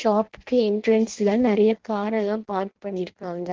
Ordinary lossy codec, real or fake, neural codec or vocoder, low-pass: Opus, 16 kbps; fake; codec, 16 kHz in and 24 kHz out, 0.6 kbps, FireRedTTS-2 codec; 7.2 kHz